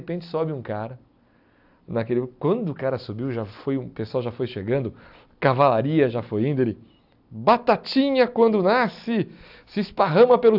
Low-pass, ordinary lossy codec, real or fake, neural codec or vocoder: 5.4 kHz; AAC, 48 kbps; real; none